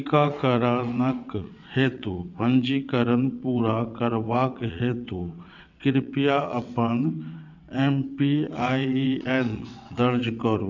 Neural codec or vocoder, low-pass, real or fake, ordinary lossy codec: vocoder, 22.05 kHz, 80 mel bands, WaveNeXt; 7.2 kHz; fake; none